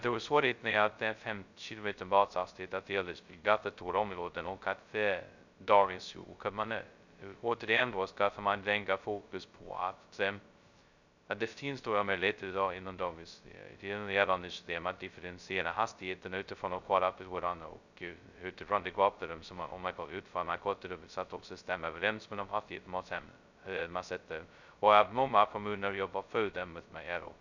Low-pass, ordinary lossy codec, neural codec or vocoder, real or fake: 7.2 kHz; none; codec, 16 kHz, 0.2 kbps, FocalCodec; fake